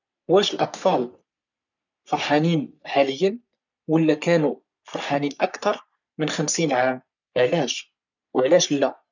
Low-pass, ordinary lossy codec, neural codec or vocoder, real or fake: 7.2 kHz; none; codec, 44.1 kHz, 3.4 kbps, Pupu-Codec; fake